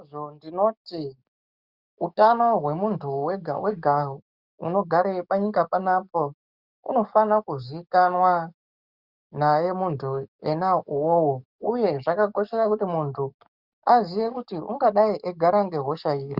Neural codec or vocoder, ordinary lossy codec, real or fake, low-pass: codec, 44.1 kHz, 7.8 kbps, DAC; Opus, 64 kbps; fake; 5.4 kHz